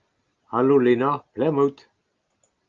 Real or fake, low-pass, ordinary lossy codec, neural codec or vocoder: real; 7.2 kHz; Opus, 32 kbps; none